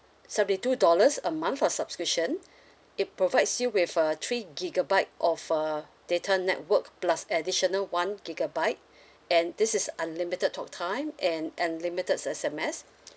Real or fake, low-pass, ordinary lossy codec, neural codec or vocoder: real; none; none; none